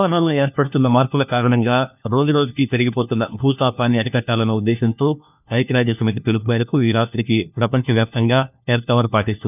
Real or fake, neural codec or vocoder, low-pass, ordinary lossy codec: fake; codec, 16 kHz, 1 kbps, FunCodec, trained on LibriTTS, 50 frames a second; 3.6 kHz; none